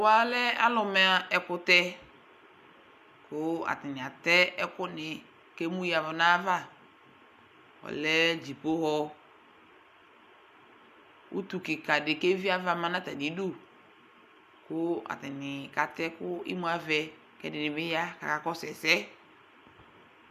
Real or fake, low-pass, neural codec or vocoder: real; 14.4 kHz; none